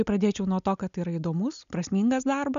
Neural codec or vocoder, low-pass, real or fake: none; 7.2 kHz; real